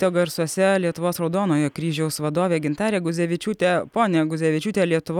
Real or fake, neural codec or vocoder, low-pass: real; none; 19.8 kHz